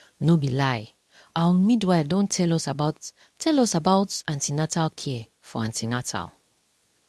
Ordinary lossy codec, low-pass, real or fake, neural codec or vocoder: none; none; fake; codec, 24 kHz, 0.9 kbps, WavTokenizer, medium speech release version 2